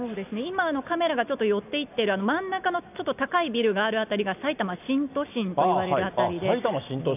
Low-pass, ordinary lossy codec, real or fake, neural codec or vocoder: 3.6 kHz; none; real; none